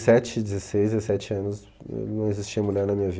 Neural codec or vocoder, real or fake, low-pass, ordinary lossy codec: none; real; none; none